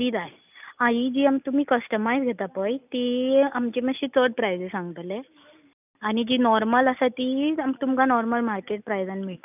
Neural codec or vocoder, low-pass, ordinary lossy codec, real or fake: none; 3.6 kHz; none; real